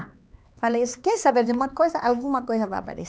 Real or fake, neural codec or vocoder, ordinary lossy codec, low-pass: fake; codec, 16 kHz, 4 kbps, X-Codec, HuBERT features, trained on LibriSpeech; none; none